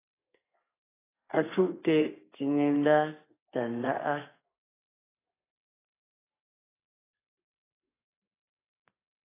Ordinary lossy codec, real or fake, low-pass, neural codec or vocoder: AAC, 16 kbps; fake; 3.6 kHz; codec, 32 kHz, 1.9 kbps, SNAC